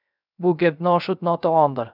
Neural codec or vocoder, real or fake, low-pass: codec, 16 kHz, 0.3 kbps, FocalCodec; fake; 5.4 kHz